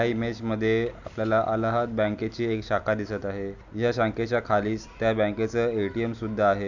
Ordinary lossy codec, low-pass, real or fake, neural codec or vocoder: none; 7.2 kHz; real; none